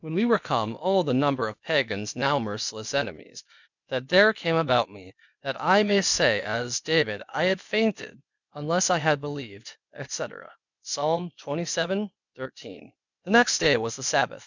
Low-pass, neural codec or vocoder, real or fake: 7.2 kHz; codec, 16 kHz, 0.8 kbps, ZipCodec; fake